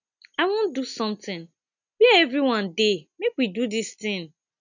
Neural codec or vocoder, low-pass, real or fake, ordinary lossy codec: none; 7.2 kHz; real; none